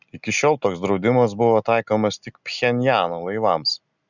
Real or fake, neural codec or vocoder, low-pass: real; none; 7.2 kHz